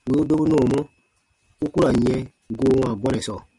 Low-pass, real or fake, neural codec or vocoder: 10.8 kHz; real; none